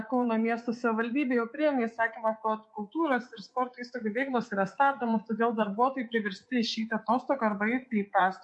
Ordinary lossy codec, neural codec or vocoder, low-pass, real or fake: MP3, 48 kbps; codec, 24 kHz, 3.1 kbps, DualCodec; 10.8 kHz; fake